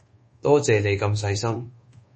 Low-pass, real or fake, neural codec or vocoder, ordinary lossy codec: 10.8 kHz; fake; codec, 24 kHz, 1.2 kbps, DualCodec; MP3, 32 kbps